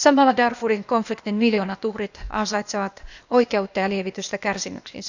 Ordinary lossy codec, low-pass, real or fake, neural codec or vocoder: none; 7.2 kHz; fake; codec, 16 kHz, 0.8 kbps, ZipCodec